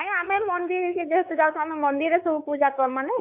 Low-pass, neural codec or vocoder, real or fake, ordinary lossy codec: 3.6 kHz; codec, 16 kHz, 4 kbps, X-Codec, WavLM features, trained on Multilingual LibriSpeech; fake; AAC, 32 kbps